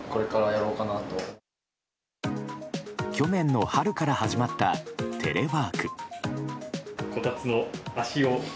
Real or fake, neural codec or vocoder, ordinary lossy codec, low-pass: real; none; none; none